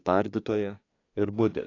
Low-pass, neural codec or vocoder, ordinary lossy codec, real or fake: 7.2 kHz; codec, 24 kHz, 1 kbps, SNAC; AAC, 32 kbps; fake